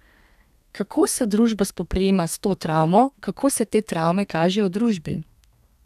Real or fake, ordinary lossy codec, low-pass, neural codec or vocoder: fake; none; 14.4 kHz; codec, 32 kHz, 1.9 kbps, SNAC